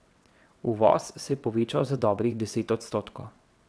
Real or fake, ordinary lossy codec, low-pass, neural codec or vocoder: fake; none; none; vocoder, 22.05 kHz, 80 mel bands, WaveNeXt